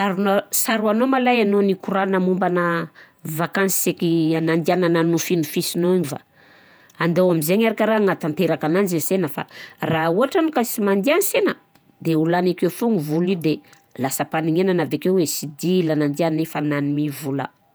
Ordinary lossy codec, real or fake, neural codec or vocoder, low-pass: none; fake; vocoder, 48 kHz, 128 mel bands, Vocos; none